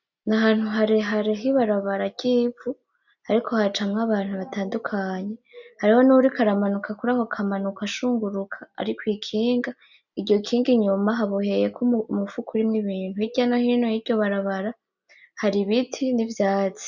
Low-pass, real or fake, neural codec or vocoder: 7.2 kHz; real; none